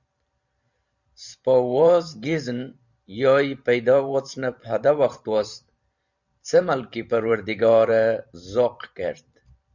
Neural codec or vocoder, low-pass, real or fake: vocoder, 44.1 kHz, 128 mel bands every 512 samples, BigVGAN v2; 7.2 kHz; fake